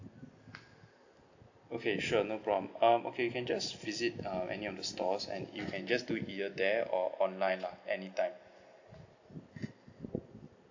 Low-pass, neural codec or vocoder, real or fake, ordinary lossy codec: 7.2 kHz; none; real; AAC, 48 kbps